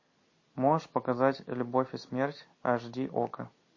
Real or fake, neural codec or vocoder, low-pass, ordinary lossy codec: real; none; 7.2 kHz; MP3, 32 kbps